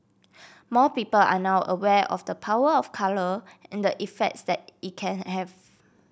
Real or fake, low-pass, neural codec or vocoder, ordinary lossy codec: real; none; none; none